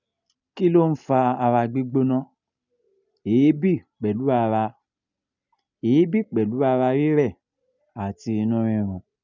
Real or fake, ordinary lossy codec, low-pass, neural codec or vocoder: real; none; 7.2 kHz; none